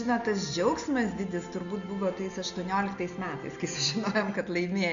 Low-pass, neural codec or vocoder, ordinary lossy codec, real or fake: 7.2 kHz; none; AAC, 96 kbps; real